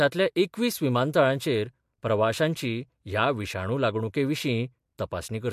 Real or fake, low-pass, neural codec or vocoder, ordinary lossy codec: real; 14.4 kHz; none; MP3, 64 kbps